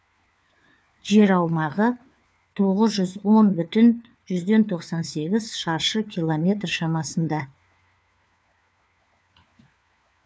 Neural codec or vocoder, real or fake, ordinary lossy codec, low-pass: codec, 16 kHz, 4 kbps, FunCodec, trained on LibriTTS, 50 frames a second; fake; none; none